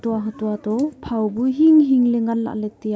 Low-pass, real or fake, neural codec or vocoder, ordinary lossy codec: none; real; none; none